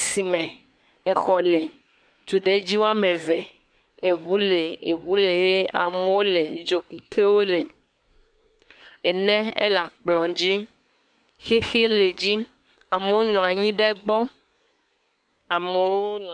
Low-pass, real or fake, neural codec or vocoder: 9.9 kHz; fake; codec, 24 kHz, 1 kbps, SNAC